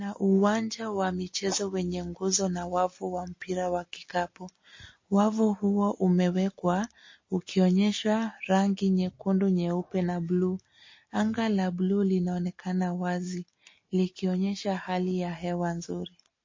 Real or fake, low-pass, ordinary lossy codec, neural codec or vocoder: real; 7.2 kHz; MP3, 32 kbps; none